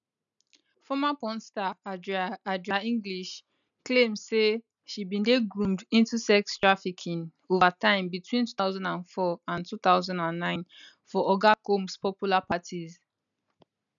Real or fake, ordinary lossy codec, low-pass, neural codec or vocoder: real; none; 7.2 kHz; none